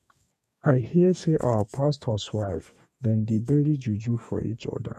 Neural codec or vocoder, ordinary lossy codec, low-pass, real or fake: codec, 44.1 kHz, 2.6 kbps, DAC; none; 14.4 kHz; fake